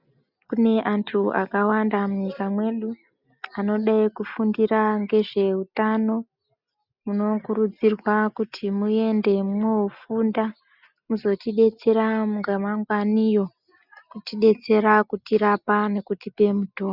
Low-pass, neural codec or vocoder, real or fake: 5.4 kHz; none; real